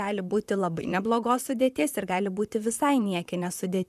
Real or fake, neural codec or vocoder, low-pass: fake; vocoder, 44.1 kHz, 128 mel bands, Pupu-Vocoder; 14.4 kHz